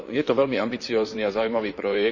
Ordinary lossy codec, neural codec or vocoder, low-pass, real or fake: none; vocoder, 44.1 kHz, 128 mel bands, Pupu-Vocoder; 7.2 kHz; fake